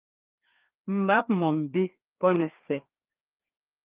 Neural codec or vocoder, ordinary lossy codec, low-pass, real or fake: codec, 16 kHz, 2 kbps, FreqCodec, larger model; Opus, 32 kbps; 3.6 kHz; fake